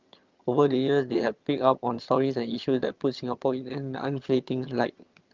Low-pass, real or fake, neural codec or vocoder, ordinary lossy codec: 7.2 kHz; fake; vocoder, 22.05 kHz, 80 mel bands, HiFi-GAN; Opus, 32 kbps